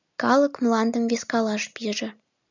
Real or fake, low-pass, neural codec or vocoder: real; 7.2 kHz; none